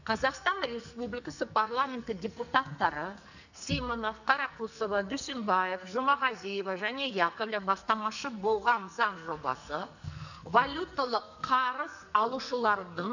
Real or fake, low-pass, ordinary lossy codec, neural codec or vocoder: fake; 7.2 kHz; none; codec, 44.1 kHz, 2.6 kbps, SNAC